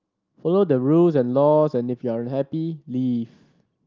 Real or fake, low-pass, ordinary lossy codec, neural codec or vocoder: real; 7.2 kHz; Opus, 32 kbps; none